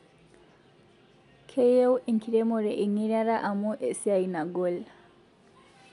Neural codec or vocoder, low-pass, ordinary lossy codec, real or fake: none; 10.8 kHz; none; real